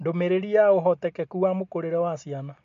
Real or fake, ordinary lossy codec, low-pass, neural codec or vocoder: real; MP3, 48 kbps; 7.2 kHz; none